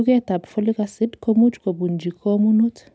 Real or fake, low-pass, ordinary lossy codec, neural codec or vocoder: real; none; none; none